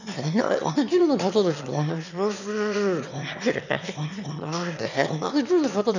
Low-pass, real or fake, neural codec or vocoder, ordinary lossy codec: 7.2 kHz; fake; autoencoder, 22.05 kHz, a latent of 192 numbers a frame, VITS, trained on one speaker; none